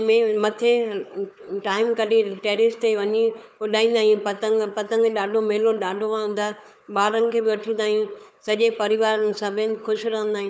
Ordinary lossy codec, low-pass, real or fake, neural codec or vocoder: none; none; fake; codec, 16 kHz, 4 kbps, FunCodec, trained on Chinese and English, 50 frames a second